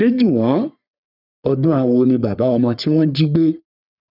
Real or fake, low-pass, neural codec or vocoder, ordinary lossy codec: fake; 5.4 kHz; codec, 44.1 kHz, 3.4 kbps, Pupu-Codec; none